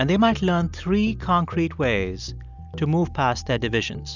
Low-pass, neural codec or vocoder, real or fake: 7.2 kHz; none; real